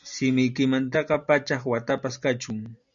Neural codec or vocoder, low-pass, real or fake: none; 7.2 kHz; real